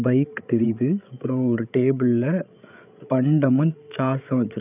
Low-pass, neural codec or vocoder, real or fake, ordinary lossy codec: 3.6 kHz; codec, 16 kHz, 8 kbps, FreqCodec, larger model; fake; none